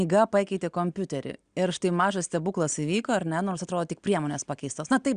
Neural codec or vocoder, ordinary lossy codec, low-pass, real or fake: none; Opus, 64 kbps; 9.9 kHz; real